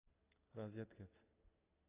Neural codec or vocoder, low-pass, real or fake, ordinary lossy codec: none; 3.6 kHz; real; AAC, 16 kbps